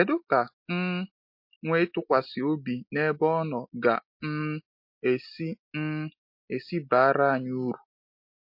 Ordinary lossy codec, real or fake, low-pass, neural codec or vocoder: MP3, 32 kbps; real; 5.4 kHz; none